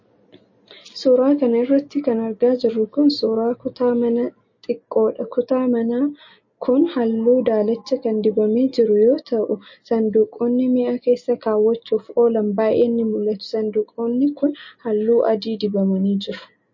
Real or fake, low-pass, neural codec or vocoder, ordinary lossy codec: real; 7.2 kHz; none; MP3, 32 kbps